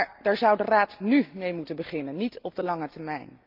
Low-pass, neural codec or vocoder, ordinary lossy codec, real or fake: 5.4 kHz; none; Opus, 24 kbps; real